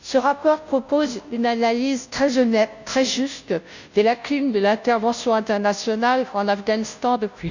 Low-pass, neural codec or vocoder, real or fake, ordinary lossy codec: 7.2 kHz; codec, 16 kHz, 0.5 kbps, FunCodec, trained on Chinese and English, 25 frames a second; fake; none